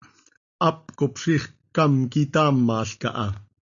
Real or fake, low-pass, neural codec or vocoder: real; 7.2 kHz; none